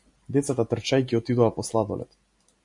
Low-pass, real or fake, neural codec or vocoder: 10.8 kHz; real; none